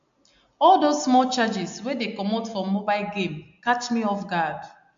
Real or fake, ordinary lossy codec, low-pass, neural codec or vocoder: real; AAC, 64 kbps; 7.2 kHz; none